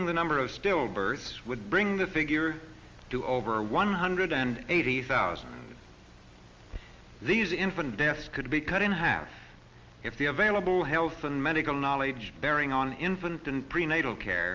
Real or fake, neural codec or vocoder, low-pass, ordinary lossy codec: real; none; 7.2 kHz; Opus, 32 kbps